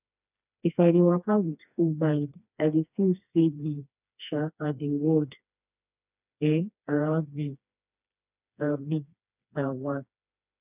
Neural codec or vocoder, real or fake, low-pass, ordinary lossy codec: codec, 16 kHz, 2 kbps, FreqCodec, smaller model; fake; 3.6 kHz; none